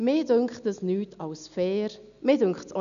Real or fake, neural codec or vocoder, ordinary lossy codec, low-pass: real; none; none; 7.2 kHz